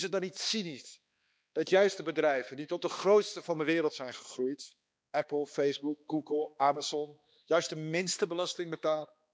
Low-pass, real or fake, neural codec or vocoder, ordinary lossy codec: none; fake; codec, 16 kHz, 2 kbps, X-Codec, HuBERT features, trained on balanced general audio; none